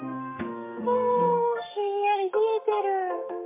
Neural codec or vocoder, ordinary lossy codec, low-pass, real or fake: codec, 32 kHz, 1.9 kbps, SNAC; MP3, 24 kbps; 3.6 kHz; fake